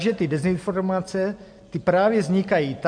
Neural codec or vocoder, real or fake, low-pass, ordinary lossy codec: none; real; 9.9 kHz; MP3, 64 kbps